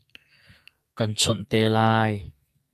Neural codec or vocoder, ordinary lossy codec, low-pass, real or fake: codec, 44.1 kHz, 2.6 kbps, SNAC; AAC, 96 kbps; 14.4 kHz; fake